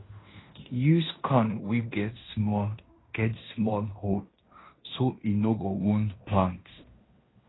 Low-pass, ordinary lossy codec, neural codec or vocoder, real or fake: 7.2 kHz; AAC, 16 kbps; codec, 16 kHz in and 24 kHz out, 0.9 kbps, LongCat-Audio-Codec, fine tuned four codebook decoder; fake